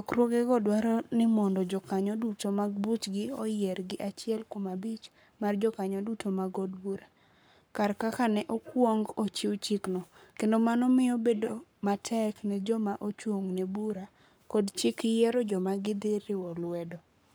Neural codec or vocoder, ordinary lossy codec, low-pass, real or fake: codec, 44.1 kHz, 7.8 kbps, Pupu-Codec; none; none; fake